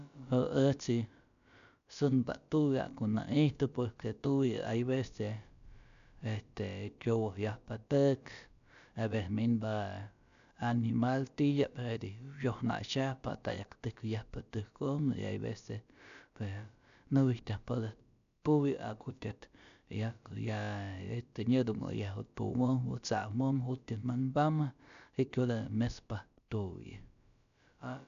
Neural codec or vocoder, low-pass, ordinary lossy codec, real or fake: codec, 16 kHz, about 1 kbps, DyCAST, with the encoder's durations; 7.2 kHz; none; fake